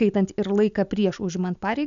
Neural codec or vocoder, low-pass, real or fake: none; 7.2 kHz; real